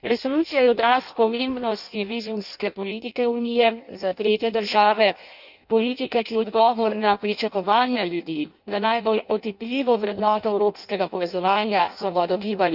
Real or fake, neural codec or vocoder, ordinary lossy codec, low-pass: fake; codec, 16 kHz in and 24 kHz out, 0.6 kbps, FireRedTTS-2 codec; none; 5.4 kHz